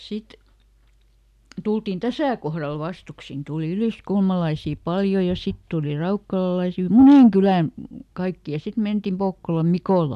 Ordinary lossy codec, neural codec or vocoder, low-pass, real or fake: none; none; 14.4 kHz; real